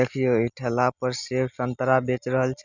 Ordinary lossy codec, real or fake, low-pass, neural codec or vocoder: none; real; 7.2 kHz; none